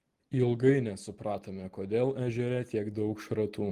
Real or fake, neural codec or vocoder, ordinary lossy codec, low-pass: real; none; Opus, 24 kbps; 14.4 kHz